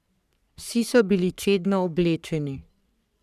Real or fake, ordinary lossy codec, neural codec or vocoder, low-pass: fake; none; codec, 44.1 kHz, 3.4 kbps, Pupu-Codec; 14.4 kHz